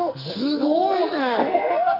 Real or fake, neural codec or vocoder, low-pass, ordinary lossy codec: fake; codec, 44.1 kHz, 2.6 kbps, SNAC; 5.4 kHz; none